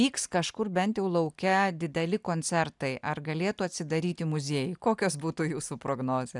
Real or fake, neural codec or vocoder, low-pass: real; none; 10.8 kHz